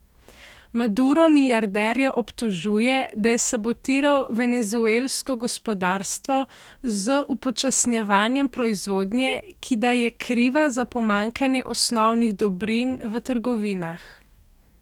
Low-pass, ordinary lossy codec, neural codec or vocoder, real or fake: 19.8 kHz; none; codec, 44.1 kHz, 2.6 kbps, DAC; fake